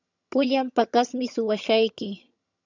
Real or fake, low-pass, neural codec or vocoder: fake; 7.2 kHz; vocoder, 22.05 kHz, 80 mel bands, HiFi-GAN